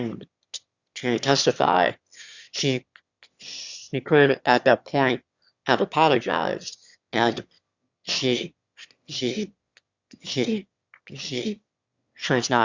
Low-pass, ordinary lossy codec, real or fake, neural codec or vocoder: 7.2 kHz; Opus, 64 kbps; fake; autoencoder, 22.05 kHz, a latent of 192 numbers a frame, VITS, trained on one speaker